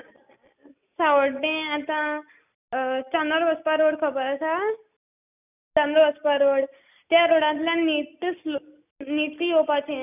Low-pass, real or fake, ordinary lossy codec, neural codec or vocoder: 3.6 kHz; real; none; none